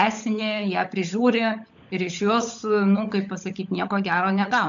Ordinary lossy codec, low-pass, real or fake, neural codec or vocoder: AAC, 64 kbps; 7.2 kHz; fake; codec, 16 kHz, 16 kbps, FunCodec, trained on LibriTTS, 50 frames a second